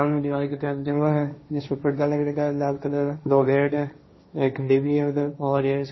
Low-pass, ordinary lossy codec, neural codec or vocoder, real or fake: 7.2 kHz; MP3, 24 kbps; codec, 16 kHz, 1.1 kbps, Voila-Tokenizer; fake